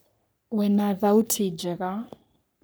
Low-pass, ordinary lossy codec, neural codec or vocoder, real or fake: none; none; codec, 44.1 kHz, 3.4 kbps, Pupu-Codec; fake